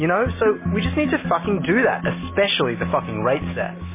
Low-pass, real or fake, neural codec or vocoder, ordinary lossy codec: 3.6 kHz; real; none; MP3, 16 kbps